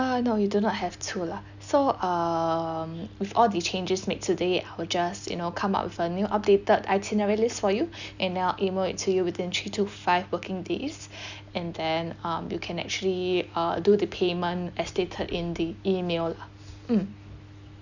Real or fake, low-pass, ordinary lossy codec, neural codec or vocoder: real; 7.2 kHz; none; none